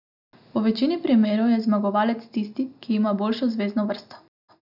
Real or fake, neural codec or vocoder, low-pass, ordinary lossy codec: real; none; 5.4 kHz; none